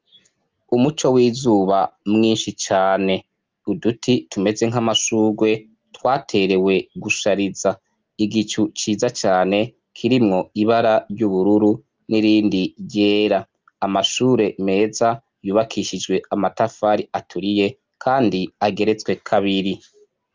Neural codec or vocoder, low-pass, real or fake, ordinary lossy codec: none; 7.2 kHz; real; Opus, 32 kbps